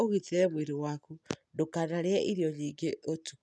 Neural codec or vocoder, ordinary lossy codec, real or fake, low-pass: none; none; real; none